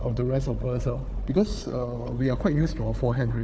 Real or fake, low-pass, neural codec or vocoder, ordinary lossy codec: fake; none; codec, 16 kHz, 4 kbps, FunCodec, trained on Chinese and English, 50 frames a second; none